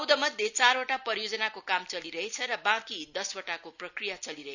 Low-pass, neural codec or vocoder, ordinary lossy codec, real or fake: 7.2 kHz; none; none; real